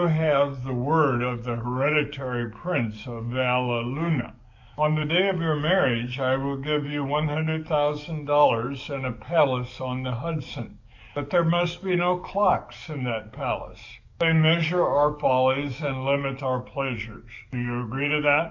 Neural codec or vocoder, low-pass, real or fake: codec, 44.1 kHz, 7.8 kbps, DAC; 7.2 kHz; fake